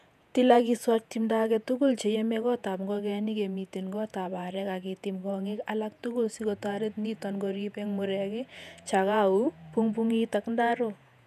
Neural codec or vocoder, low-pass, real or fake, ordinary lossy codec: vocoder, 48 kHz, 128 mel bands, Vocos; 14.4 kHz; fake; none